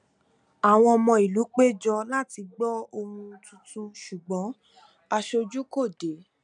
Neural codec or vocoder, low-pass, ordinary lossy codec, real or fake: none; 9.9 kHz; none; real